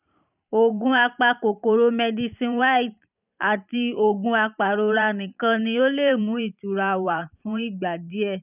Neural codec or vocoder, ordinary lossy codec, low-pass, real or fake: vocoder, 44.1 kHz, 128 mel bands every 512 samples, BigVGAN v2; none; 3.6 kHz; fake